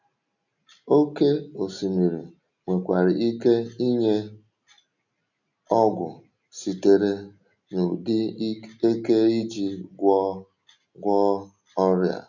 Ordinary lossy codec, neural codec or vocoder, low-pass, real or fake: none; none; 7.2 kHz; real